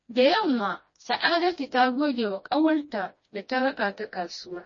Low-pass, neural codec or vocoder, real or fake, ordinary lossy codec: 7.2 kHz; codec, 16 kHz, 1 kbps, FreqCodec, smaller model; fake; MP3, 32 kbps